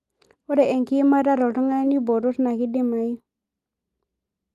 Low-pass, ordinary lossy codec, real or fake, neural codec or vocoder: 14.4 kHz; Opus, 32 kbps; real; none